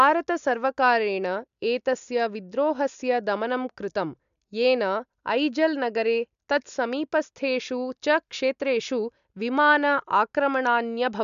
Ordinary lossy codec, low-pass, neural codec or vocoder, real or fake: AAC, 96 kbps; 7.2 kHz; none; real